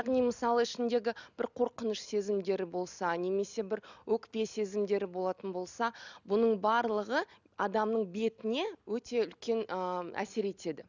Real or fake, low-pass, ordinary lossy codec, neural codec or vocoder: real; 7.2 kHz; none; none